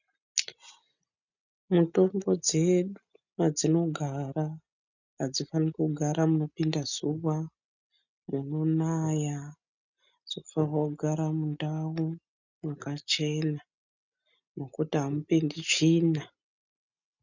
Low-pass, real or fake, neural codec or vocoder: 7.2 kHz; real; none